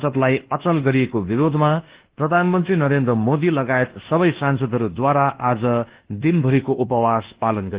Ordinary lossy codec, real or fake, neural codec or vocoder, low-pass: Opus, 16 kbps; fake; codec, 24 kHz, 1.2 kbps, DualCodec; 3.6 kHz